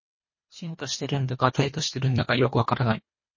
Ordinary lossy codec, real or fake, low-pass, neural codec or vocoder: MP3, 32 kbps; fake; 7.2 kHz; codec, 24 kHz, 1.5 kbps, HILCodec